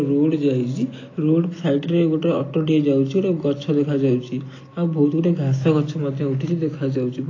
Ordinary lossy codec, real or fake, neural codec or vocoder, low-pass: AAC, 32 kbps; real; none; 7.2 kHz